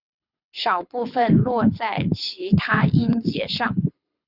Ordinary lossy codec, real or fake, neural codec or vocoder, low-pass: Opus, 64 kbps; fake; codec, 24 kHz, 6 kbps, HILCodec; 5.4 kHz